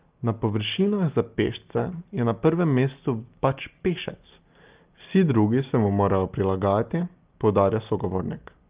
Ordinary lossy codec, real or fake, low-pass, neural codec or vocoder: Opus, 32 kbps; real; 3.6 kHz; none